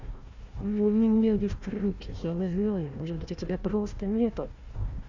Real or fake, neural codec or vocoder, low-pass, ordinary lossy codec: fake; codec, 16 kHz, 1 kbps, FunCodec, trained on Chinese and English, 50 frames a second; 7.2 kHz; none